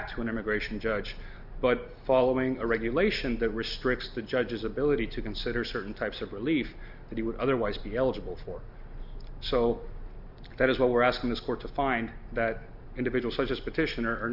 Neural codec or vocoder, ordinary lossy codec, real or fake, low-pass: none; Opus, 64 kbps; real; 5.4 kHz